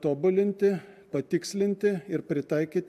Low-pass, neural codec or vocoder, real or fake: 14.4 kHz; none; real